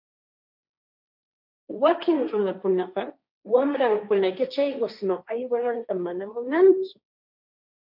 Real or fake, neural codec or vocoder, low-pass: fake; codec, 16 kHz, 1.1 kbps, Voila-Tokenizer; 5.4 kHz